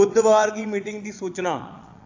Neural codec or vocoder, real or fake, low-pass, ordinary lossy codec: vocoder, 22.05 kHz, 80 mel bands, Vocos; fake; 7.2 kHz; AAC, 48 kbps